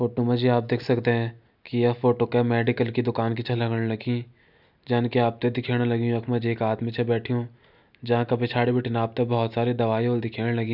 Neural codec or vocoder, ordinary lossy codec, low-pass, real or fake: none; none; 5.4 kHz; real